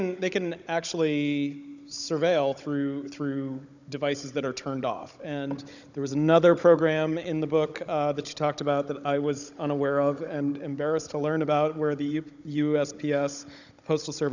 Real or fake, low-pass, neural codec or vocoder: fake; 7.2 kHz; codec, 16 kHz, 16 kbps, FunCodec, trained on Chinese and English, 50 frames a second